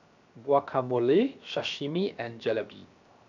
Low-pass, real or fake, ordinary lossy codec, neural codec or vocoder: 7.2 kHz; fake; none; codec, 16 kHz, 0.7 kbps, FocalCodec